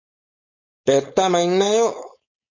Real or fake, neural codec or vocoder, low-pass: fake; codec, 16 kHz, 4.8 kbps, FACodec; 7.2 kHz